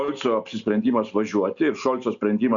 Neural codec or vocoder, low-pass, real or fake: none; 7.2 kHz; real